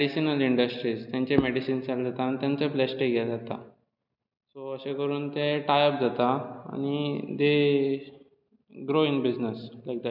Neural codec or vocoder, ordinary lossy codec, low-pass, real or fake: none; none; 5.4 kHz; real